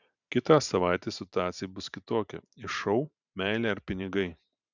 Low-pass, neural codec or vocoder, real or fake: 7.2 kHz; vocoder, 44.1 kHz, 128 mel bands every 512 samples, BigVGAN v2; fake